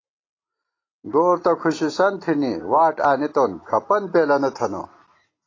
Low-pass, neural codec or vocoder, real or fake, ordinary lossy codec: 7.2 kHz; none; real; AAC, 32 kbps